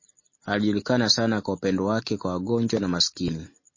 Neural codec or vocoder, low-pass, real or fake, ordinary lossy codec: none; 7.2 kHz; real; MP3, 32 kbps